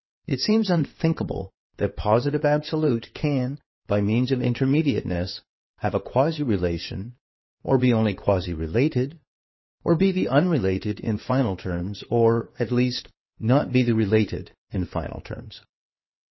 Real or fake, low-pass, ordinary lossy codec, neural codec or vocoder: fake; 7.2 kHz; MP3, 24 kbps; codec, 16 kHz in and 24 kHz out, 2.2 kbps, FireRedTTS-2 codec